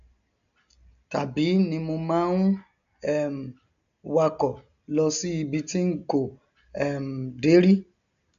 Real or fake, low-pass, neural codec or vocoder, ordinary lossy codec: real; 7.2 kHz; none; none